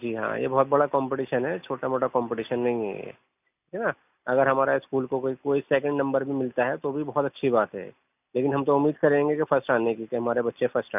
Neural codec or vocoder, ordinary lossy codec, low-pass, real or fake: none; none; 3.6 kHz; real